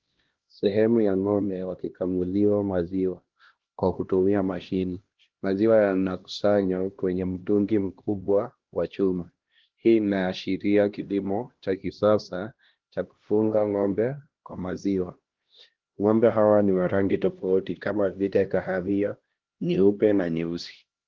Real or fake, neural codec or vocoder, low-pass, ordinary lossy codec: fake; codec, 16 kHz, 1 kbps, X-Codec, HuBERT features, trained on LibriSpeech; 7.2 kHz; Opus, 16 kbps